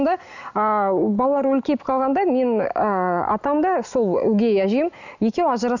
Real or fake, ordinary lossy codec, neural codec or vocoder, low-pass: real; none; none; 7.2 kHz